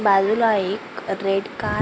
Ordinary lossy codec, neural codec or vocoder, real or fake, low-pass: none; none; real; none